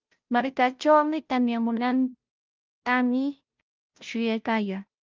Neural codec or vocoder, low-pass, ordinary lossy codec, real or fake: codec, 16 kHz, 0.5 kbps, FunCodec, trained on Chinese and English, 25 frames a second; 7.2 kHz; Opus, 24 kbps; fake